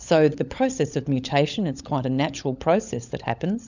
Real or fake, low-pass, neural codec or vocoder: fake; 7.2 kHz; codec, 16 kHz, 16 kbps, FunCodec, trained on LibriTTS, 50 frames a second